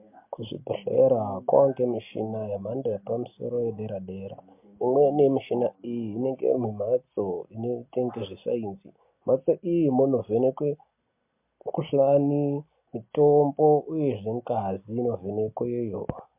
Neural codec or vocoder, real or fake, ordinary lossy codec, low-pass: none; real; MP3, 32 kbps; 3.6 kHz